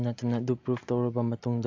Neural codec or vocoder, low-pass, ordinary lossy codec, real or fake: none; 7.2 kHz; AAC, 48 kbps; real